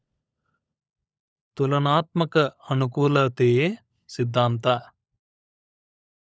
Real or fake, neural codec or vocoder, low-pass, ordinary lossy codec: fake; codec, 16 kHz, 16 kbps, FunCodec, trained on LibriTTS, 50 frames a second; none; none